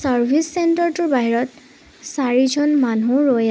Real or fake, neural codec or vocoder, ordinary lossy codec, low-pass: real; none; none; none